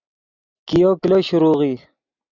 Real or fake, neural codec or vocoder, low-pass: real; none; 7.2 kHz